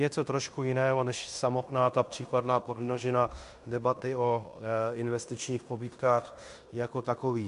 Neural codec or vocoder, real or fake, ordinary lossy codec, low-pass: codec, 16 kHz in and 24 kHz out, 0.9 kbps, LongCat-Audio-Codec, fine tuned four codebook decoder; fake; MP3, 64 kbps; 10.8 kHz